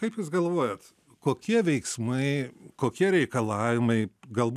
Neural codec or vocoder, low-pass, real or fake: none; 14.4 kHz; real